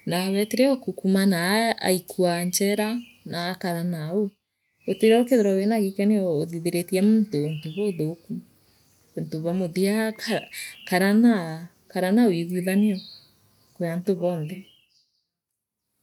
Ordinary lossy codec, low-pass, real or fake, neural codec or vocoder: none; 19.8 kHz; real; none